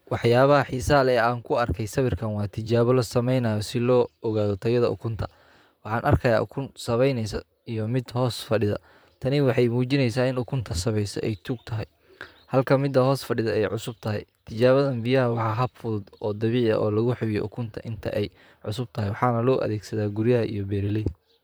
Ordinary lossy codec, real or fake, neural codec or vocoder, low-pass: none; fake; vocoder, 44.1 kHz, 128 mel bands, Pupu-Vocoder; none